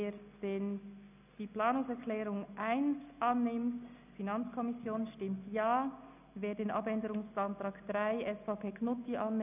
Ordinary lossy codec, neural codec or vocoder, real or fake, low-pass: none; none; real; 3.6 kHz